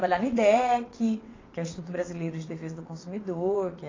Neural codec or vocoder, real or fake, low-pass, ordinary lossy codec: vocoder, 22.05 kHz, 80 mel bands, WaveNeXt; fake; 7.2 kHz; AAC, 32 kbps